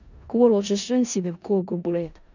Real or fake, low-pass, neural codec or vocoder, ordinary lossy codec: fake; 7.2 kHz; codec, 16 kHz in and 24 kHz out, 0.4 kbps, LongCat-Audio-Codec, four codebook decoder; none